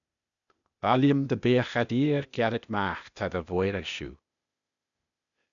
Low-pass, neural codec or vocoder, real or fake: 7.2 kHz; codec, 16 kHz, 0.8 kbps, ZipCodec; fake